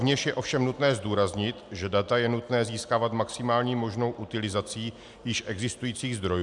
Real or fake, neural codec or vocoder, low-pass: real; none; 10.8 kHz